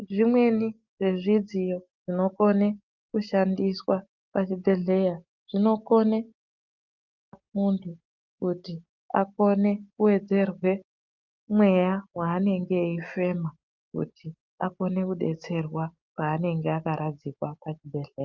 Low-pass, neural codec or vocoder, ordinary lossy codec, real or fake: 7.2 kHz; none; Opus, 32 kbps; real